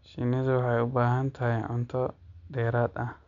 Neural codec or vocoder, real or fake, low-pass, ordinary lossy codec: none; real; 7.2 kHz; none